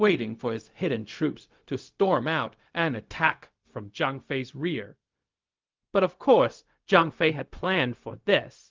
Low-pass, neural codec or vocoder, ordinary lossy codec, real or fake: 7.2 kHz; codec, 16 kHz, 0.4 kbps, LongCat-Audio-Codec; Opus, 32 kbps; fake